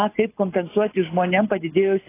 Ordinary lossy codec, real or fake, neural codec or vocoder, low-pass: AAC, 24 kbps; real; none; 3.6 kHz